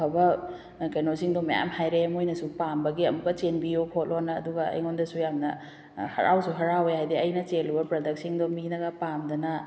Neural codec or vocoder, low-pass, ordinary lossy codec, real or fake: none; none; none; real